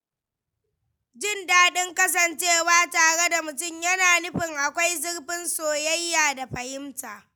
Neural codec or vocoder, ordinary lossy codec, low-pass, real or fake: none; none; none; real